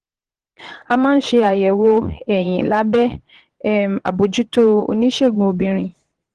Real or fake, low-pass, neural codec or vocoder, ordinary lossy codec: fake; 9.9 kHz; vocoder, 22.05 kHz, 80 mel bands, Vocos; Opus, 16 kbps